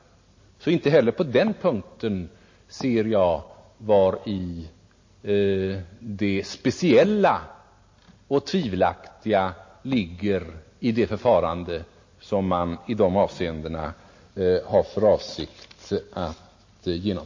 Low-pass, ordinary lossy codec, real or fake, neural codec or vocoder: 7.2 kHz; MP3, 32 kbps; real; none